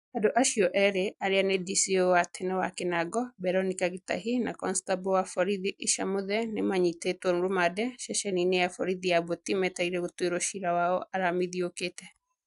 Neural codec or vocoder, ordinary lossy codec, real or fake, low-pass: none; AAC, 96 kbps; real; 10.8 kHz